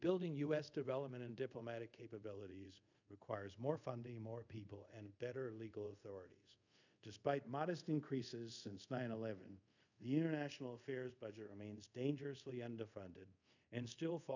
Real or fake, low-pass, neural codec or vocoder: fake; 7.2 kHz; codec, 24 kHz, 0.5 kbps, DualCodec